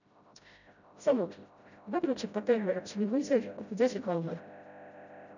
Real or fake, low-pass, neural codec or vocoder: fake; 7.2 kHz; codec, 16 kHz, 0.5 kbps, FreqCodec, smaller model